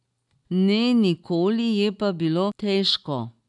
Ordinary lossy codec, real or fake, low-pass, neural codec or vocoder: none; real; 10.8 kHz; none